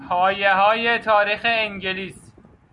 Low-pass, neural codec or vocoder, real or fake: 10.8 kHz; none; real